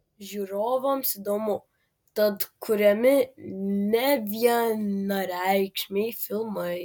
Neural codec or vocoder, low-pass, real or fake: none; 19.8 kHz; real